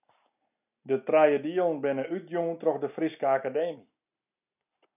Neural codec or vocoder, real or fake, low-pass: none; real; 3.6 kHz